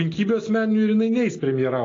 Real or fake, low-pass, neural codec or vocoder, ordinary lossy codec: real; 7.2 kHz; none; AAC, 32 kbps